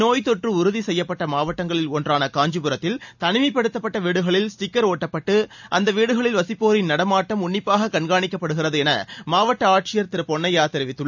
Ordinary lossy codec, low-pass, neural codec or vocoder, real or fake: none; 7.2 kHz; none; real